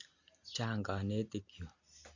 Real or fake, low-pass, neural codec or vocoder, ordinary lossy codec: real; 7.2 kHz; none; Opus, 64 kbps